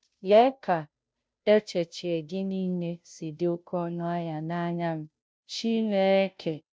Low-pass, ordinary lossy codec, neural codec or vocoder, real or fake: none; none; codec, 16 kHz, 0.5 kbps, FunCodec, trained on Chinese and English, 25 frames a second; fake